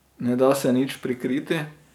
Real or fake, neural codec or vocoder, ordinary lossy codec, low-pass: fake; codec, 44.1 kHz, 7.8 kbps, DAC; none; 19.8 kHz